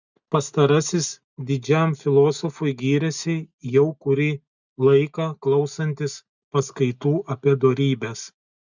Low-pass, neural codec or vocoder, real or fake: 7.2 kHz; none; real